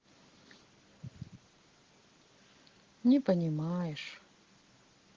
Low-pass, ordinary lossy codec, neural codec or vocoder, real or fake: 7.2 kHz; Opus, 16 kbps; none; real